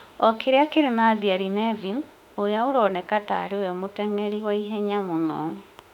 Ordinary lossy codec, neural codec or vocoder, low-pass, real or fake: none; autoencoder, 48 kHz, 32 numbers a frame, DAC-VAE, trained on Japanese speech; 19.8 kHz; fake